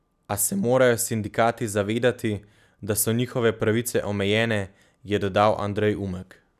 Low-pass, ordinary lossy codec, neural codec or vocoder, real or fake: 14.4 kHz; none; none; real